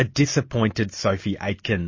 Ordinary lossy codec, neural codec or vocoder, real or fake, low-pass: MP3, 32 kbps; none; real; 7.2 kHz